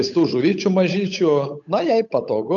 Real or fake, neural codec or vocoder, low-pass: fake; codec, 16 kHz, 8 kbps, FunCodec, trained on Chinese and English, 25 frames a second; 7.2 kHz